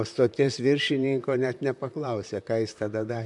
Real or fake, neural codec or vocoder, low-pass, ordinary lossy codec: fake; vocoder, 44.1 kHz, 128 mel bands, Pupu-Vocoder; 10.8 kHz; MP3, 64 kbps